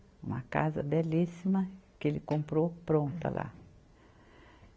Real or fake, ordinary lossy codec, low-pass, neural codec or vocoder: real; none; none; none